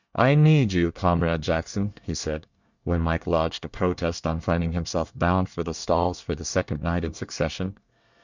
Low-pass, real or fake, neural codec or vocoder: 7.2 kHz; fake; codec, 24 kHz, 1 kbps, SNAC